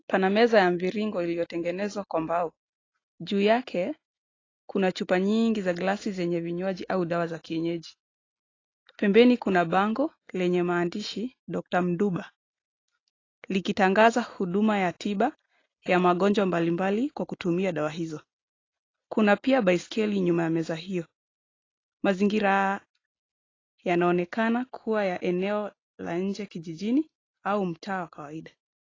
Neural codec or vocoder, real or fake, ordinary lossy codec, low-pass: none; real; AAC, 32 kbps; 7.2 kHz